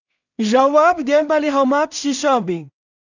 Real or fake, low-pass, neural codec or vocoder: fake; 7.2 kHz; codec, 16 kHz in and 24 kHz out, 0.4 kbps, LongCat-Audio-Codec, two codebook decoder